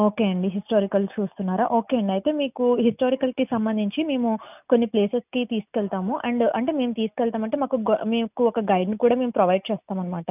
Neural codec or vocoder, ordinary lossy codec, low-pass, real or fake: none; none; 3.6 kHz; real